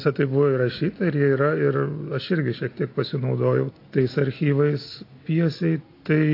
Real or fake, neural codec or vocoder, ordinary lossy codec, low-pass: real; none; AAC, 32 kbps; 5.4 kHz